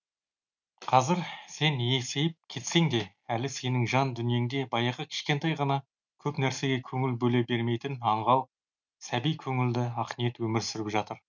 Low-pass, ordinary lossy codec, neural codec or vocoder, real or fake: 7.2 kHz; none; none; real